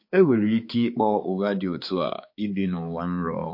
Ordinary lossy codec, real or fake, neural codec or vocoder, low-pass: MP3, 32 kbps; fake; codec, 16 kHz, 4 kbps, X-Codec, HuBERT features, trained on balanced general audio; 5.4 kHz